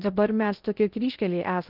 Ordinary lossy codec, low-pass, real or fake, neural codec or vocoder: Opus, 32 kbps; 5.4 kHz; fake; codec, 16 kHz in and 24 kHz out, 0.6 kbps, FocalCodec, streaming, 2048 codes